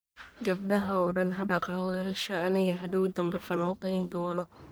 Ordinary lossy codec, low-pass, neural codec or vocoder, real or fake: none; none; codec, 44.1 kHz, 1.7 kbps, Pupu-Codec; fake